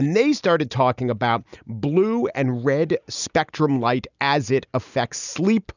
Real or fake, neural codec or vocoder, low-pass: real; none; 7.2 kHz